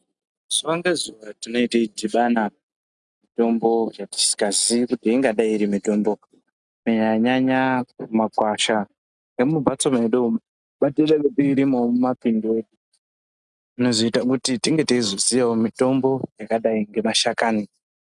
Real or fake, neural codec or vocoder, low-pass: real; none; 10.8 kHz